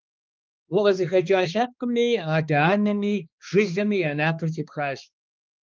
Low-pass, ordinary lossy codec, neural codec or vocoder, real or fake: 7.2 kHz; Opus, 32 kbps; codec, 16 kHz, 2 kbps, X-Codec, HuBERT features, trained on balanced general audio; fake